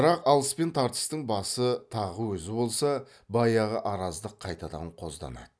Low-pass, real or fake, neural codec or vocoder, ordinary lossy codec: none; real; none; none